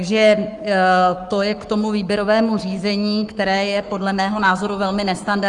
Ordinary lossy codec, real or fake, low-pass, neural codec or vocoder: Opus, 32 kbps; fake; 10.8 kHz; codec, 44.1 kHz, 7.8 kbps, Pupu-Codec